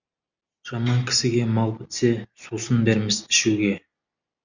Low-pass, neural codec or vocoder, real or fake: 7.2 kHz; none; real